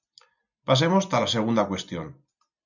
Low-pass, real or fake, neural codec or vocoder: 7.2 kHz; real; none